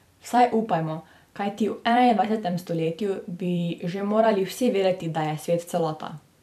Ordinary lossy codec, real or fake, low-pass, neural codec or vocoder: none; fake; 14.4 kHz; vocoder, 44.1 kHz, 128 mel bands every 256 samples, BigVGAN v2